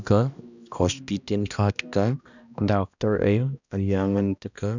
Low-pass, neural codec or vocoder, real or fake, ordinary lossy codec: 7.2 kHz; codec, 16 kHz, 1 kbps, X-Codec, HuBERT features, trained on balanced general audio; fake; none